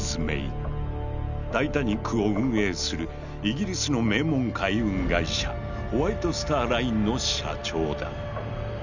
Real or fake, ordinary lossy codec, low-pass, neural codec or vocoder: real; none; 7.2 kHz; none